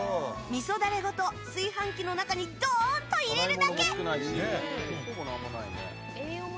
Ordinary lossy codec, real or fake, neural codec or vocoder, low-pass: none; real; none; none